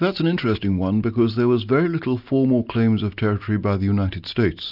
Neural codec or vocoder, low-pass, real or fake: none; 5.4 kHz; real